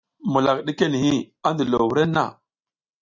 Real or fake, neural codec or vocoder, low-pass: real; none; 7.2 kHz